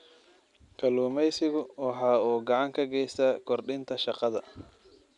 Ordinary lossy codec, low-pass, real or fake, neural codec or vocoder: none; 10.8 kHz; real; none